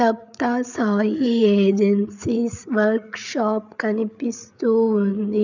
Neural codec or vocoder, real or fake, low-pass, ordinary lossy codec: codec, 16 kHz, 8 kbps, FreqCodec, larger model; fake; 7.2 kHz; none